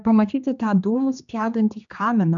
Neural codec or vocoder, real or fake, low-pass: codec, 16 kHz, 2 kbps, X-Codec, HuBERT features, trained on general audio; fake; 7.2 kHz